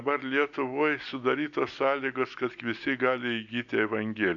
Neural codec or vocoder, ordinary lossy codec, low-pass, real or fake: none; MP3, 96 kbps; 7.2 kHz; real